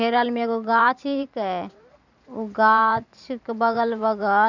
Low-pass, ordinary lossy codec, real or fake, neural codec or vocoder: 7.2 kHz; none; fake; vocoder, 44.1 kHz, 128 mel bands every 512 samples, BigVGAN v2